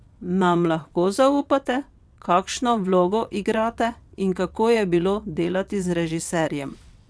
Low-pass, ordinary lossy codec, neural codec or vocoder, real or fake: none; none; vocoder, 22.05 kHz, 80 mel bands, WaveNeXt; fake